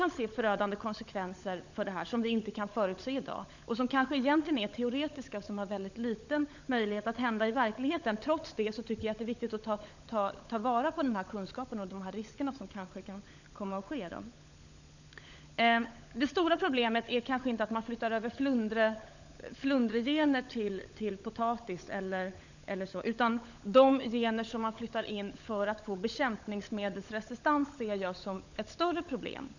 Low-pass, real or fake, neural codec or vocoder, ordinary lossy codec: 7.2 kHz; fake; codec, 16 kHz, 16 kbps, FunCodec, trained on LibriTTS, 50 frames a second; none